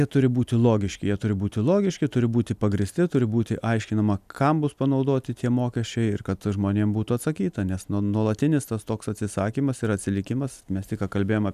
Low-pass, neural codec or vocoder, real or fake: 14.4 kHz; none; real